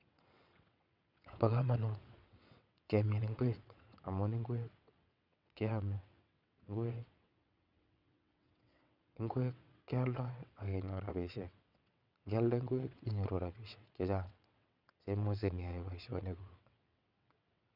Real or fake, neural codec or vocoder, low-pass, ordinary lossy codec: fake; vocoder, 22.05 kHz, 80 mel bands, WaveNeXt; 5.4 kHz; none